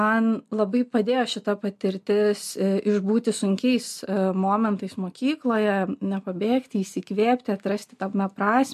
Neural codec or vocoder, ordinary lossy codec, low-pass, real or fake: none; MP3, 64 kbps; 14.4 kHz; real